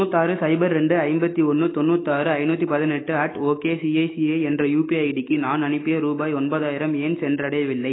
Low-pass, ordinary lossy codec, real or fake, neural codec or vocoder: 7.2 kHz; AAC, 16 kbps; fake; autoencoder, 48 kHz, 128 numbers a frame, DAC-VAE, trained on Japanese speech